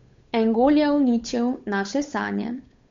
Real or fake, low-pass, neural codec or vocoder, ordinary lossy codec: fake; 7.2 kHz; codec, 16 kHz, 8 kbps, FunCodec, trained on Chinese and English, 25 frames a second; MP3, 48 kbps